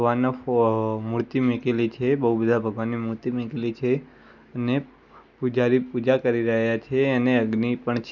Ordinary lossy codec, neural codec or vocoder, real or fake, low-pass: none; none; real; 7.2 kHz